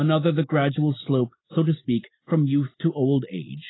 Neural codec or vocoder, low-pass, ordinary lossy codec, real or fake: none; 7.2 kHz; AAC, 16 kbps; real